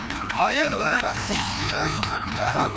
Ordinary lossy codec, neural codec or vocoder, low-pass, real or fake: none; codec, 16 kHz, 1 kbps, FreqCodec, larger model; none; fake